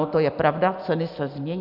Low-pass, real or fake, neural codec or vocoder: 5.4 kHz; real; none